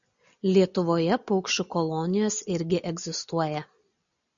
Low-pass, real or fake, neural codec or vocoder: 7.2 kHz; real; none